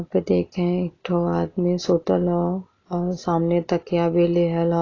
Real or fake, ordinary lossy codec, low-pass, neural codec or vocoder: real; AAC, 32 kbps; 7.2 kHz; none